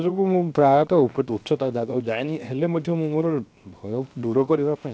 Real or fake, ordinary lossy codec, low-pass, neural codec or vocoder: fake; none; none; codec, 16 kHz, 0.7 kbps, FocalCodec